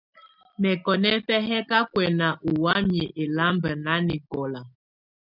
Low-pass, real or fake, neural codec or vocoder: 5.4 kHz; real; none